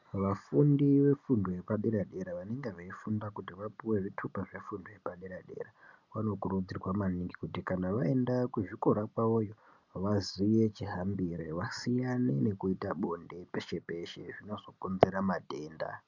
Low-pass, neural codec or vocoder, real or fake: 7.2 kHz; none; real